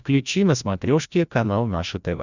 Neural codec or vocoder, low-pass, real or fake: codec, 16 kHz, 1 kbps, FreqCodec, larger model; 7.2 kHz; fake